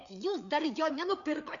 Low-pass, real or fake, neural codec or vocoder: 7.2 kHz; fake; codec, 16 kHz, 4 kbps, FreqCodec, larger model